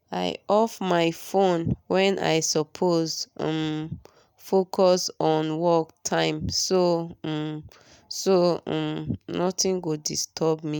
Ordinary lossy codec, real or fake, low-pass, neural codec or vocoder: none; real; none; none